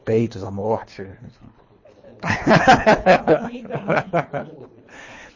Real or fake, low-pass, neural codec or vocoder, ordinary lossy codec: fake; 7.2 kHz; codec, 24 kHz, 3 kbps, HILCodec; MP3, 32 kbps